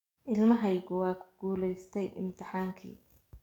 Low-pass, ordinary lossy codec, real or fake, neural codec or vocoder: 19.8 kHz; none; fake; codec, 44.1 kHz, 7.8 kbps, DAC